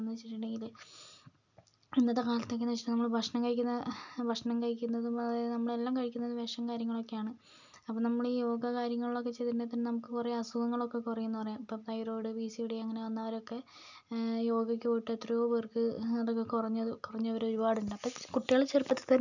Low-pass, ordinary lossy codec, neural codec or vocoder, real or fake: 7.2 kHz; none; none; real